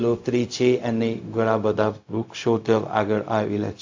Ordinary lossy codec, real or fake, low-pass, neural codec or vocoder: AAC, 48 kbps; fake; 7.2 kHz; codec, 16 kHz, 0.4 kbps, LongCat-Audio-Codec